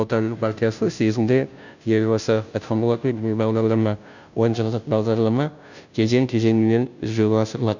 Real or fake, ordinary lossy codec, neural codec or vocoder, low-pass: fake; none; codec, 16 kHz, 0.5 kbps, FunCodec, trained on Chinese and English, 25 frames a second; 7.2 kHz